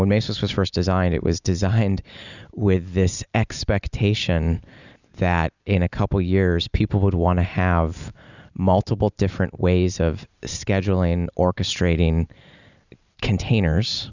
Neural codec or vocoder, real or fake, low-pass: none; real; 7.2 kHz